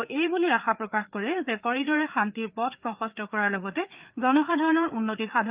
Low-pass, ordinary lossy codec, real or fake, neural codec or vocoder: 3.6 kHz; Opus, 24 kbps; fake; codec, 16 kHz, 4 kbps, FreqCodec, larger model